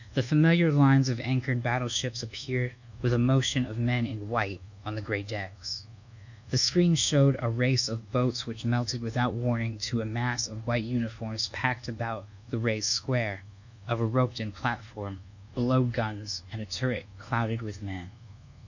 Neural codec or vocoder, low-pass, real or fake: codec, 24 kHz, 1.2 kbps, DualCodec; 7.2 kHz; fake